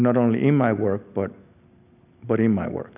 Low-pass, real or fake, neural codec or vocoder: 3.6 kHz; real; none